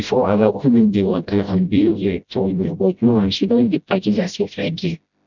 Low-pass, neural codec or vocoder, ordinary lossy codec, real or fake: 7.2 kHz; codec, 16 kHz, 0.5 kbps, FreqCodec, smaller model; none; fake